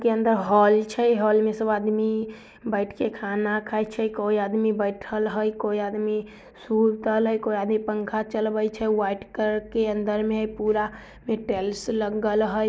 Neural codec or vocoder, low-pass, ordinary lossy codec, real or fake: none; none; none; real